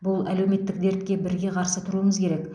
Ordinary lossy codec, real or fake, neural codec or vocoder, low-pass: none; real; none; 9.9 kHz